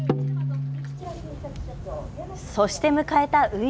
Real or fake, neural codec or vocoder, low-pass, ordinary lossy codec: real; none; none; none